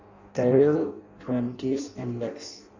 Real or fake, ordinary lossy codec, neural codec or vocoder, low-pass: fake; none; codec, 16 kHz in and 24 kHz out, 0.6 kbps, FireRedTTS-2 codec; 7.2 kHz